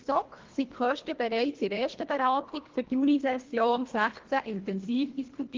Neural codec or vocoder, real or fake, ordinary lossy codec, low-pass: codec, 24 kHz, 1.5 kbps, HILCodec; fake; Opus, 16 kbps; 7.2 kHz